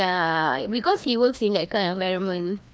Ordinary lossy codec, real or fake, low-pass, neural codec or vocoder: none; fake; none; codec, 16 kHz, 1 kbps, FreqCodec, larger model